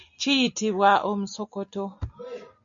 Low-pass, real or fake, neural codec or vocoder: 7.2 kHz; real; none